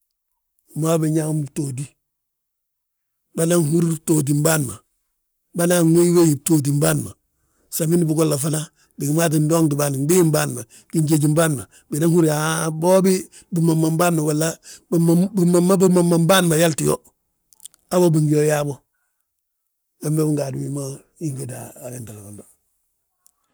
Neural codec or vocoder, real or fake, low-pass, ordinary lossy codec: codec, 44.1 kHz, 7.8 kbps, Pupu-Codec; fake; none; none